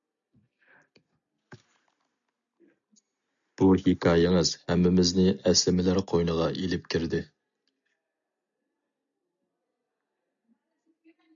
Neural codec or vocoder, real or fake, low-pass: none; real; 7.2 kHz